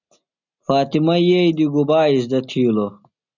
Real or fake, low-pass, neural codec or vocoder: real; 7.2 kHz; none